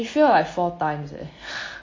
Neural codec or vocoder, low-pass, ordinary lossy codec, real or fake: none; 7.2 kHz; MP3, 32 kbps; real